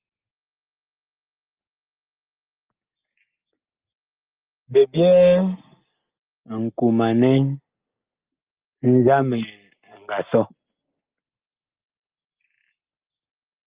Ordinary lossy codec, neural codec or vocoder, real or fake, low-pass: Opus, 32 kbps; none; real; 3.6 kHz